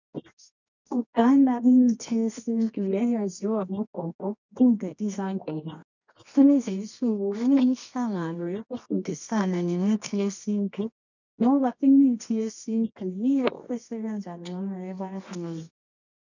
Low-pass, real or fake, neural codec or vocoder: 7.2 kHz; fake; codec, 24 kHz, 0.9 kbps, WavTokenizer, medium music audio release